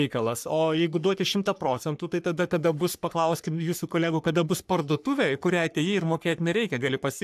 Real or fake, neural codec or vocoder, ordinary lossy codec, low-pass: fake; codec, 44.1 kHz, 3.4 kbps, Pupu-Codec; AAC, 96 kbps; 14.4 kHz